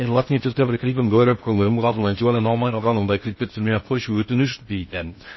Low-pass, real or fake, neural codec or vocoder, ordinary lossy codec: 7.2 kHz; fake; codec, 16 kHz in and 24 kHz out, 0.6 kbps, FocalCodec, streaming, 4096 codes; MP3, 24 kbps